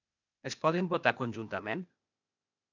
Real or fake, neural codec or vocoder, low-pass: fake; codec, 16 kHz, 0.8 kbps, ZipCodec; 7.2 kHz